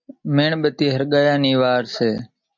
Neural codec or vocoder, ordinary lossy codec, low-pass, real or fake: none; MP3, 64 kbps; 7.2 kHz; real